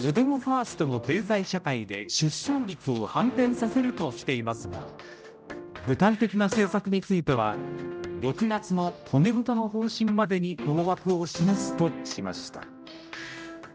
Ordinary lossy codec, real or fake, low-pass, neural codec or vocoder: none; fake; none; codec, 16 kHz, 0.5 kbps, X-Codec, HuBERT features, trained on general audio